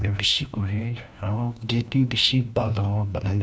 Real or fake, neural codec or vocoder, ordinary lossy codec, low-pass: fake; codec, 16 kHz, 1 kbps, FunCodec, trained on LibriTTS, 50 frames a second; none; none